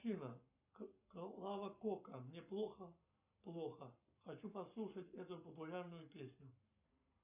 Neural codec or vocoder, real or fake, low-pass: codec, 44.1 kHz, 7.8 kbps, Pupu-Codec; fake; 3.6 kHz